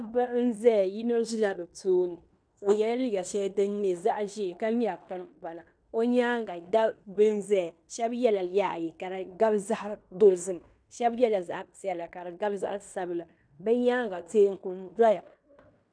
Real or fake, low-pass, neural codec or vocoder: fake; 9.9 kHz; codec, 16 kHz in and 24 kHz out, 0.9 kbps, LongCat-Audio-Codec, fine tuned four codebook decoder